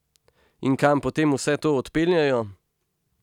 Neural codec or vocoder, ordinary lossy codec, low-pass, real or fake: none; none; 19.8 kHz; real